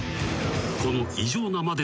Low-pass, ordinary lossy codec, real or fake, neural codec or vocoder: none; none; real; none